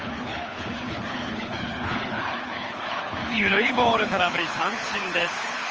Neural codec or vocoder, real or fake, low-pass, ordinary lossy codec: codec, 24 kHz, 6 kbps, HILCodec; fake; 7.2 kHz; Opus, 24 kbps